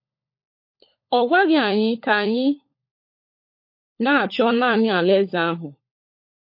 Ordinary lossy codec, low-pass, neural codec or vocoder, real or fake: MP3, 32 kbps; 5.4 kHz; codec, 16 kHz, 16 kbps, FunCodec, trained on LibriTTS, 50 frames a second; fake